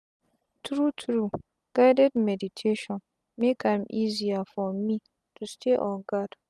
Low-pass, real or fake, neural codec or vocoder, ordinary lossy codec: none; real; none; none